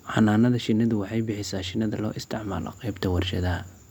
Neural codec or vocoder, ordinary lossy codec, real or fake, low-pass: none; none; real; 19.8 kHz